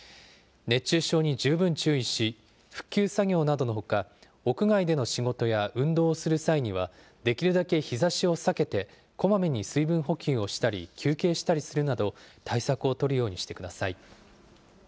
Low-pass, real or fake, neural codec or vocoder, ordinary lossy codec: none; real; none; none